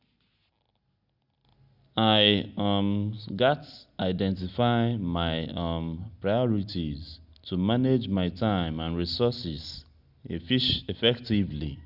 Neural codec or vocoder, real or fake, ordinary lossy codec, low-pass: none; real; Opus, 64 kbps; 5.4 kHz